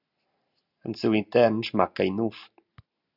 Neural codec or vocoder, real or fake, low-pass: none; real; 5.4 kHz